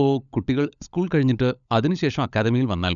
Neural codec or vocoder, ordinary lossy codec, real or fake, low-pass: codec, 16 kHz, 16 kbps, FunCodec, trained on LibriTTS, 50 frames a second; none; fake; 7.2 kHz